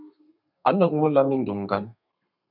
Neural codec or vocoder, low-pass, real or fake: codec, 32 kHz, 1.9 kbps, SNAC; 5.4 kHz; fake